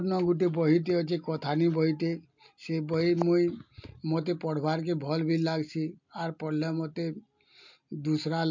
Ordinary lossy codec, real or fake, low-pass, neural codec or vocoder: MP3, 48 kbps; real; 7.2 kHz; none